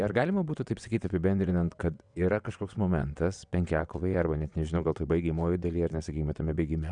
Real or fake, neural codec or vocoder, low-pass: fake; vocoder, 22.05 kHz, 80 mel bands, WaveNeXt; 9.9 kHz